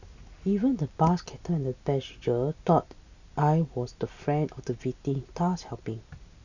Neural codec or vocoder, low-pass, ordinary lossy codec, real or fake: none; 7.2 kHz; none; real